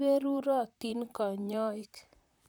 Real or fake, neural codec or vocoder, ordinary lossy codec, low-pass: fake; vocoder, 44.1 kHz, 128 mel bands, Pupu-Vocoder; none; none